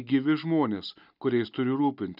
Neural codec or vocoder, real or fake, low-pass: none; real; 5.4 kHz